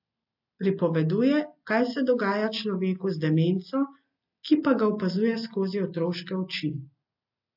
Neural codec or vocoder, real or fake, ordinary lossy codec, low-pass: none; real; AAC, 48 kbps; 5.4 kHz